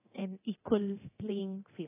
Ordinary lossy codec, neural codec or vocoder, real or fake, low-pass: AAC, 16 kbps; codec, 24 kHz, 0.9 kbps, DualCodec; fake; 3.6 kHz